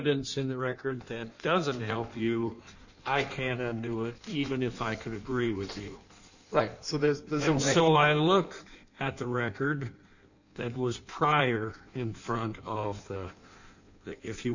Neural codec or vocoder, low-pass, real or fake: codec, 16 kHz in and 24 kHz out, 1.1 kbps, FireRedTTS-2 codec; 7.2 kHz; fake